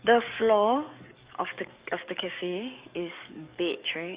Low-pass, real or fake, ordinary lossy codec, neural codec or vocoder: 3.6 kHz; fake; Opus, 64 kbps; codec, 44.1 kHz, 7.8 kbps, DAC